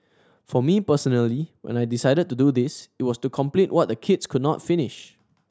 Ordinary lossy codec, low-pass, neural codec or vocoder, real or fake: none; none; none; real